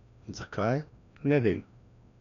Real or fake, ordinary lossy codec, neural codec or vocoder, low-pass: fake; none; codec, 16 kHz, 1 kbps, FreqCodec, larger model; 7.2 kHz